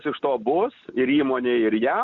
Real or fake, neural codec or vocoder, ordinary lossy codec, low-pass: real; none; Opus, 64 kbps; 7.2 kHz